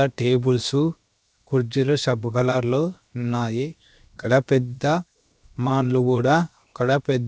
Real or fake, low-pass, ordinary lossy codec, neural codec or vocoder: fake; none; none; codec, 16 kHz, 0.7 kbps, FocalCodec